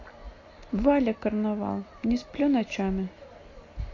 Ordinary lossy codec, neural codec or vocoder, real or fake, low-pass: AAC, 32 kbps; none; real; 7.2 kHz